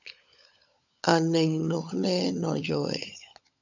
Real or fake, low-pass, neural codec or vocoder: fake; 7.2 kHz; codec, 16 kHz, 16 kbps, FunCodec, trained on LibriTTS, 50 frames a second